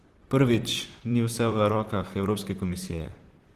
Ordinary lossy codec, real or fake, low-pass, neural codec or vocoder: Opus, 16 kbps; fake; 14.4 kHz; vocoder, 44.1 kHz, 128 mel bands, Pupu-Vocoder